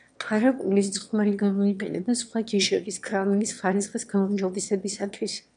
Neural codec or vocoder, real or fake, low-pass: autoencoder, 22.05 kHz, a latent of 192 numbers a frame, VITS, trained on one speaker; fake; 9.9 kHz